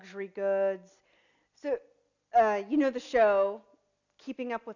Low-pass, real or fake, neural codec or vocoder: 7.2 kHz; real; none